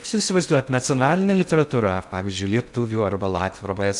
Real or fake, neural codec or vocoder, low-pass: fake; codec, 16 kHz in and 24 kHz out, 0.6 kbps, FocalCodec, streaming, 2048 codes; 10.8 kHz